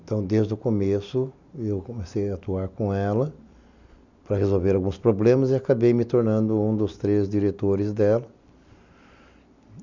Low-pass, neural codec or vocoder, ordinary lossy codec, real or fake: 7.2 kHz; none; none; real